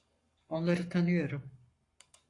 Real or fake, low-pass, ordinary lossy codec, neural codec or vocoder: fake; 10.8 kHz; AAC, 48 kbps; codec, 44.1 kHz, 7.8 kbps, Pupu-Codec